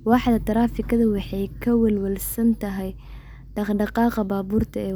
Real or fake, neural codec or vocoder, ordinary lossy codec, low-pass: real; none; none; none